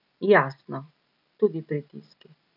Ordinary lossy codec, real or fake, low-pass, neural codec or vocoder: none; real; 5.4 kHz; none